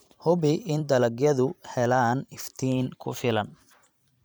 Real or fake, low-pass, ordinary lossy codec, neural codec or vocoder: fake; none; none; vocoder, 44.1 kHz, 128 mel bands every 512 samples, BigVGAN v2